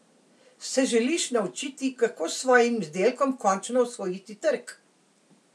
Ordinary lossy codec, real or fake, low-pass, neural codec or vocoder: none; real; none; none